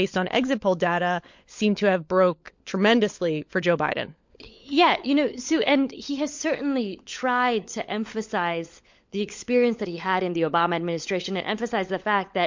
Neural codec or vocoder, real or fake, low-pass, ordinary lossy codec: codec, 16 kHz, 16 kbps, FunCodec, trained on LibriTTS, 50 frames a second; fake; 7.2 kHz; MP3, 48 kbps